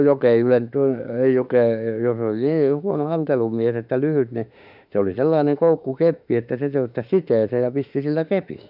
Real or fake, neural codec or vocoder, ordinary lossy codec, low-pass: fake; autoencoder, 48 kHz, 32 numbers a frame, DAC-VAE, trained on Japanese speech; none; 5.4 kHz